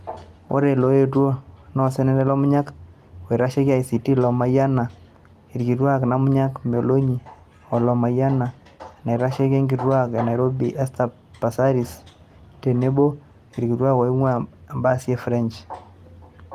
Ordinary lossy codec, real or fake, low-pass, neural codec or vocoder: Opus, 24 kbps; real; 14.4 kHz; none